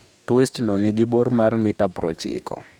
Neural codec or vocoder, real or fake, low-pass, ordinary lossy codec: codec, 44.1 kHz, 2.6 kbps, DAC; fake; 19.8 kHz; none